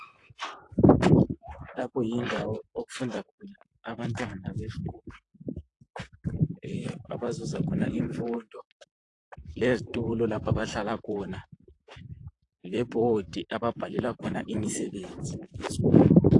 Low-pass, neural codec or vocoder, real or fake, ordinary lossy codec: 10.8 kHz; vocoder, 44.1 kHz, 128 mel bands, Pupu-Vocoder; fake; AAC, 48 kbps